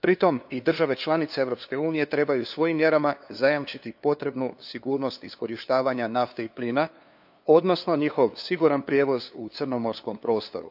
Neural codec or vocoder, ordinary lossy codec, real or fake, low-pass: codec, 16 kHz, 2 kbps, FunCodec, trained on LibriTTS, 25 frames a second; AAC, 48 kbps; fake; 5.4 kHz